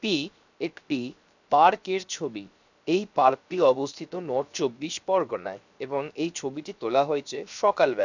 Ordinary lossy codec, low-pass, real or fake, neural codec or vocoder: none; 7.2 kHz; fake; codec, 16 kHz, 0.7 kbps, FocalCodec